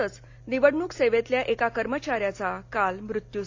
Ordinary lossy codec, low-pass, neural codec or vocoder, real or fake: AAC, 48 kbps; 7.2 kHz; none; real